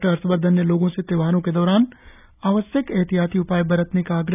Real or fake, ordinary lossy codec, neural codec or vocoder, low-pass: real; none; none; 3.6 kHz